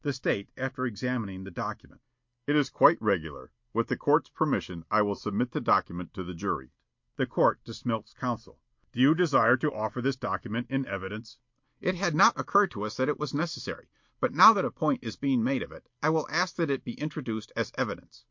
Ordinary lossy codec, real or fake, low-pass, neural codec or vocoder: MP3, 48 kbps; real; 7.2 kHz; none